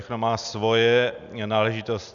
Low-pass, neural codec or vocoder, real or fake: 7.2 kHz; none; real